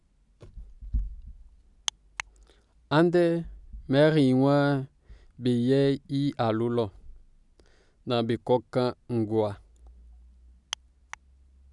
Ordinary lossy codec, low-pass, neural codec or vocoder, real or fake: none; 10.8 kHz; none; real